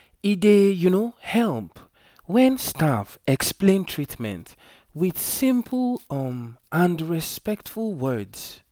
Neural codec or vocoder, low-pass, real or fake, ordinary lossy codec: none; none; real; none